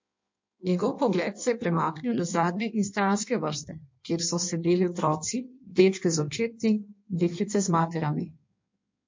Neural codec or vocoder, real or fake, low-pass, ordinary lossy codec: codec, 16 kHz in and 24 kHz out, 1.1 kbps, FireRedTTS-2 codec; fake; 7.2 kHz; MP3, 48 kbps